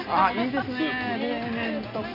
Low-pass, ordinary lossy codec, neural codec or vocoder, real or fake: 5.4 kHz; none; none; real